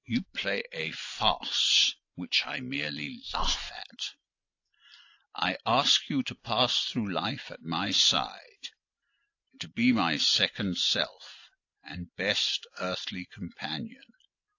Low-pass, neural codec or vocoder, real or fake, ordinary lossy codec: 7.2 kHz; none; real; AAC, 48 kbps